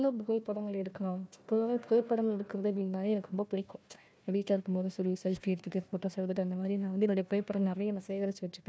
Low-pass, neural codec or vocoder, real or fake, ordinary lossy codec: none; codec, 16 kHz, 1 kbps, FunCodec, trained on Chinese and English, 50 frames a second; fake; none